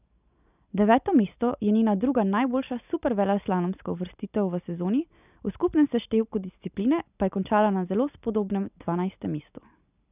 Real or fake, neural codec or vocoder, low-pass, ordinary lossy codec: real; none; 3.6 kHz; none